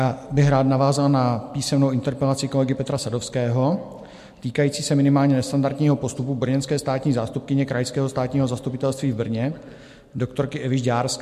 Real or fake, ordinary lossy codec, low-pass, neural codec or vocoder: real; MP3, 64 kbps; 14.4 kHz; none